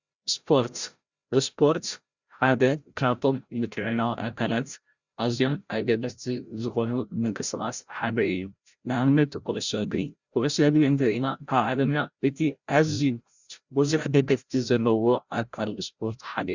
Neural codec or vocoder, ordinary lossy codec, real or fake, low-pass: codec, 16 kHz, 0.5 kbps, FreqCodec, larger model; Opus, 64 kbps; fake; 7.2 kHz